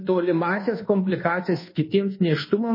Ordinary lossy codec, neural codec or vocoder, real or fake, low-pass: MP3, 24 kbps; codec, 24 kHz, 1.2 kbps, DualCodec; fake; 5.4 kHz